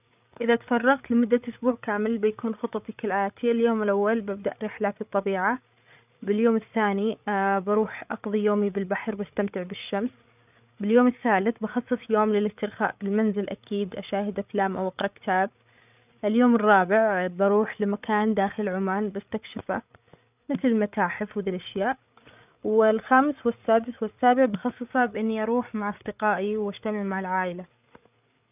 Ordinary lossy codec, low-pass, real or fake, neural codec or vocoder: none; 3.6 kHz; fake; codec, 16 kHz, 8 kbps, FreqCodec, larger model